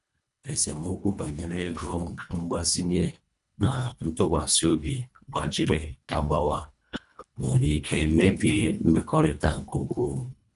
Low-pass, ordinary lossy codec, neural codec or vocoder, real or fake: 10.8 kHz; none; codec, 24 kHz, 1.5 kbps, HILCodec; fake